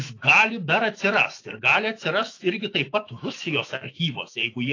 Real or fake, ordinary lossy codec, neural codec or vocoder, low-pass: fake; AAC, 32 kbps; autoencoder, 48 kHz, 128 numbers a frame, DAC-VAE, trained on Japanese speech; 7.2 kHz